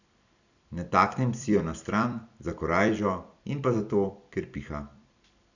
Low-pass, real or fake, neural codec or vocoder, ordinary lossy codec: 7.2 kHz; fake; vocoder, 44.1 kHz, 128 mel bands every 256 samples, BigVGAN v2; none